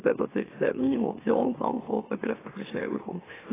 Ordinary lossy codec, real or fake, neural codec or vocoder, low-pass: AAC, 16 kbps; fake; autoencoder, 44.1 kHz, a latent of 192 numbers a frame, MeloTTS; 3.6 kHz